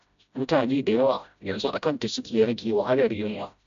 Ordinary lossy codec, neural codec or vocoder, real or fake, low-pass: MP3, 64 kbps; codec, 16 kHz, 0.5 kbps, FreqCodec, smaller model; fake; 7.2 kHz